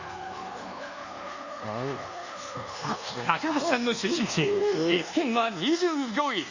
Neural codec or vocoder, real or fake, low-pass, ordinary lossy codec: codec, 24 kHz, 1.2 kbps, DualCodec; fake; 7.2 kHz; Opus, 64 kbps